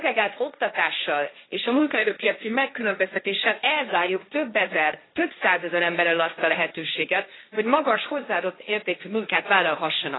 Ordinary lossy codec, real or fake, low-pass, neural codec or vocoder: AAC, 16 kbps; fake; 7.2 kHz; codec, 16 kHz, 1.1 kbps, Voila-Tokenizer